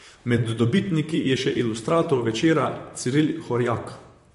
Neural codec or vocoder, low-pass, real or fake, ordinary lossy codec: vocoder, 44.1 kHz, 128 mel bands, Pupu-Vocoder; 14.4 kHz; fake; MP3, 48 kbps